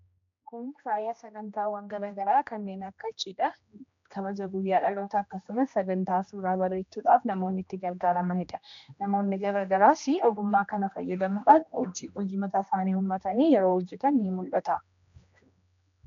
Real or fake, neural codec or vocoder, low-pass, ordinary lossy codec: fake; codec, 16 kHz, 1 kbps, X-Codec, HuBERT features, trained on general audio; 7.2 kHz; MP3, 64 kbps